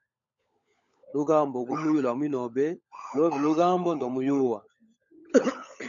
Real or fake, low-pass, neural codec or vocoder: fake; 7.2 kHz; codec, 16 kHz, 16 kbps, FunCodec, trained on LibriTTS, 50 frames a second